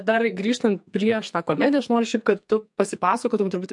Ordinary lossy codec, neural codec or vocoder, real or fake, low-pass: MP3, 64 kbps; codec, 44.1 kHz, 2.6 kbps, SNAC; fake; 10.8 kHz